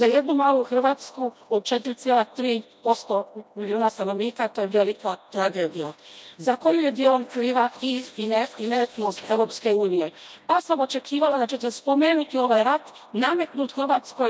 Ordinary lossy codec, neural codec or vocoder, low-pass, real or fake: none; codec, 16 kHz, 1 kbps, FreqCodec, smaller model; none; fake